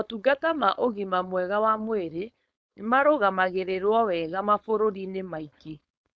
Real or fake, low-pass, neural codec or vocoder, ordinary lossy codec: fake; none; codec, 16 kHz, 4.8 kbps, FACodec; none